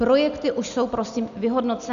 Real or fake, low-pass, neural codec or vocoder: real; 7.2 kHz; none